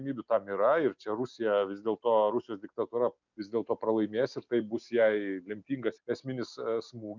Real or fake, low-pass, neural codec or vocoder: real; 7.2 kHz; none